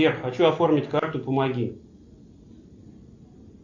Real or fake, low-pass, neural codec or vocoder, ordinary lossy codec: real; 7.2 kHz; none; MP3, 64 kbps